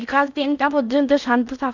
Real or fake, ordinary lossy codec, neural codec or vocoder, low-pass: fake; none; codec, 16 kHz in and 24 kHz out, 0.6 kbps, FocalCodec, streaming, 4096 codes; 7.2 kHz